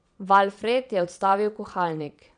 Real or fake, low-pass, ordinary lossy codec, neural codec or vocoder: fake; 9.9 kHz; none; vocoder, 22.05 kHz, 80 mel bands, Vocos